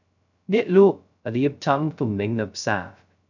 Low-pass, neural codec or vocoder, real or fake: 7.2 kHz; codec, 16 kHz, 0.2 kbps, FocalCodec; fake